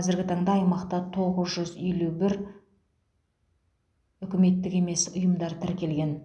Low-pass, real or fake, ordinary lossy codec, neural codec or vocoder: none; real; none; none